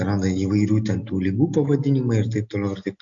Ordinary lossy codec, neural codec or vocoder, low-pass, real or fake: AAC, 64 kbps; none; 7.2 kHz; real